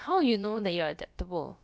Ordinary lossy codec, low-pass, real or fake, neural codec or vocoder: none; none; fake; codec, 16 kHz, about 1 kbps, DyCAST, with the encoder's durations